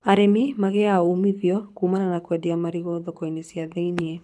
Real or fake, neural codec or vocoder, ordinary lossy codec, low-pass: fake; codec, 24 kHz, 6 kbps, HILCodec; none; none